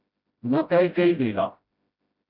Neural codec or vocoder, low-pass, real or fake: codec, 16 kHz, 0.5 kbps, FreqCodec, smaller model; 5.4 kHz; fake